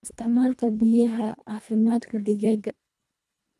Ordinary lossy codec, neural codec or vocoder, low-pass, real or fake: none; codec, 24 kHz, 1.5 kbps, HILCodec; none; fake